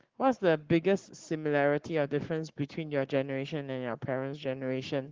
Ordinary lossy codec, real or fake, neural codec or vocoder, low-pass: Opus, 16 kbps; fake; codec, 44.1 kHz, 7.8 kbps, Pupu-Codec; 7.2 kHz